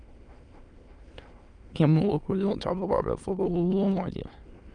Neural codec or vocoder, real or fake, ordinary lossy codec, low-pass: autoencoder, 22.05 kHz, a latent of 192 numbers a frame, VITS, trained on many speakers; fake; Opus, 32 kbps; 9.9 kHz